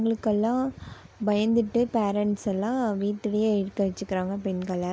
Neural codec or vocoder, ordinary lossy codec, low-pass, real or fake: none; none; none; real